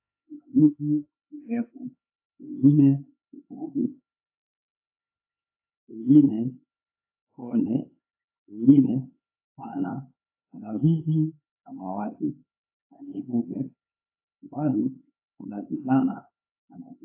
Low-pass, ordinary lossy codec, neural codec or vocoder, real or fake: 3.6 kHz; AAC, 32 kbps; codec, 16 kHz, 4 kbps, X-Codec, HuBERT features, trained on LibriSpeech; fake